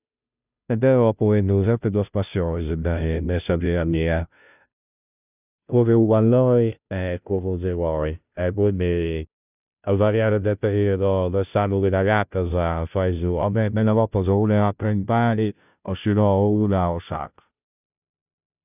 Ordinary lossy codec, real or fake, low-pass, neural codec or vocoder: none; fake; 3.6 kHz; codec, 16 kHz, 0.5 kbps, FunCodec, trained on Chinese and English, 25 frames a second